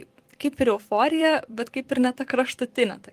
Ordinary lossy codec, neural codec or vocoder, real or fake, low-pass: Opus, 24 kbps; vocoder, 44.1 kHz, 128 mel bands every 256 samples, BigVGAN v2; fake; 14.4 kHz